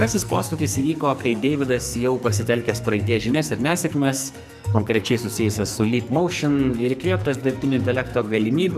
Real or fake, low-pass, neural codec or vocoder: fake; 14.4 kHz; codec, 44.1 kHz, 2.6 kbps, SNAC